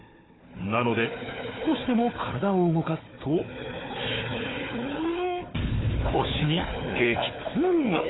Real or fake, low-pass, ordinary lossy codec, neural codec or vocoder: fake; 7.2 kHz; AAC, 16 kbps; codec, 16 kHz, 4 kbps, FunCodec, trained on Chinese and English, 50 frames a second